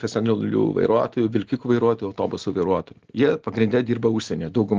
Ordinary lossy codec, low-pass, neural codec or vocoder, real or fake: Opus, 16 kbps; 7.2 kHz; none; real